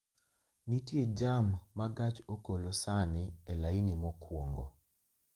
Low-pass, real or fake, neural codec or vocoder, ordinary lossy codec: 19.8 kHz; fake; codec, 44.1 kHz, 7.8 kbps, DAC; Opus, 32 kbps